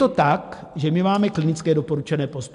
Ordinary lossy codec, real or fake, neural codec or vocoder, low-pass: MP3, 64 kbps; real; none; 10.8 kHz